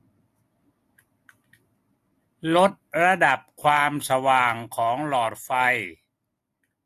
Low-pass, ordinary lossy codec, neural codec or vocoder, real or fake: 14.4 kHz; AAC, 64 kbps; none; real